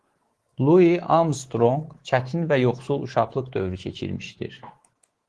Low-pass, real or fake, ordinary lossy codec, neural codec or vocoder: 10.8 kHz; fake; Opus, 16 kbps; codec, 24 kHz, 3.1 kbps, DualCodec